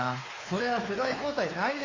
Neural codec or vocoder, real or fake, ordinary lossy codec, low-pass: codec, 16 kHz, 4 kbps, X-Codec, WavLM features, trained on Multilingual LibriSpeech; fake; AAC, 32 kbps; 7.2 kHz